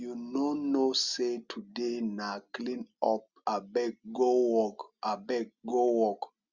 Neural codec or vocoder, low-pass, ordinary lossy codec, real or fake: none; 7.2 kHz; Opus, 64 kbps; real